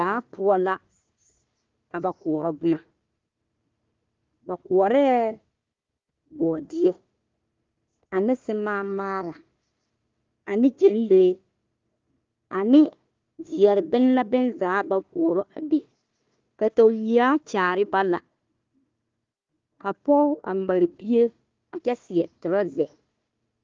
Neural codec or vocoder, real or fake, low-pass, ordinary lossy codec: codec, 16 kHz, 1 kbps, FunCodec, trained on Chinese and English, 50 frames a second; fake; 7.2 kHz; Opus, 32 kbps